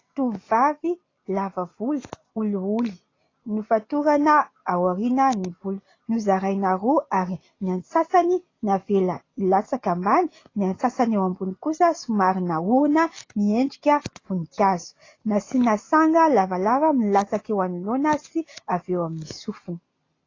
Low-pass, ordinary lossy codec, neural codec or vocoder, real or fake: 7.2 kHz; AAC, 32 kbps; none; real